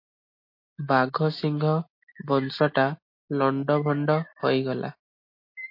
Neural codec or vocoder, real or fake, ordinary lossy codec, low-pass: none; real; MP3, 32 kbps; 5.4 kHz